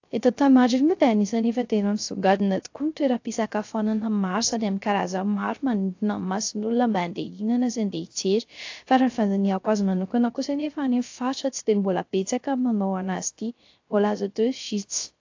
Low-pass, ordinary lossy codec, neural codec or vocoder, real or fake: 7.2 kHz; AAC, 48 kbps; codec, 16 kHz, 0.3 kbps, FocalCodec; fake